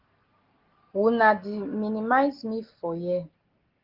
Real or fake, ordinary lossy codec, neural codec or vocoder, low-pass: real; Opus, 16 kbps; none; 5.4 kHz